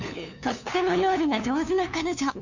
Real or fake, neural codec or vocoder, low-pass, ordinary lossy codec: fake; codec, 16 kHz, 2 kbps, FunCodec, trained on LibriTTS, 25 frames a second; 7.2 kHz; none